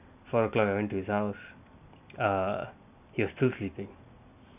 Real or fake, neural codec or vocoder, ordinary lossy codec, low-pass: real; none; none; 3.6 kHz